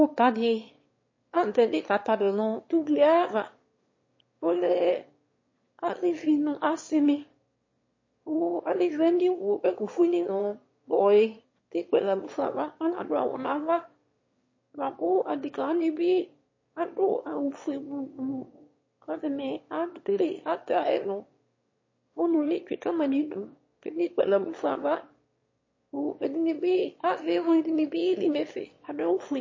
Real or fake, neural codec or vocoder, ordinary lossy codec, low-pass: fake; autoencoder, 22.05 kHz, a latent of 192 numbers a frame, VITS, trained on one speaker; MP3, 32 kbps; 7.2 kHz